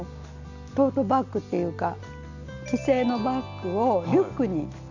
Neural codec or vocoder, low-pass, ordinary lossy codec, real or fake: none; 7.2 kHz; none; real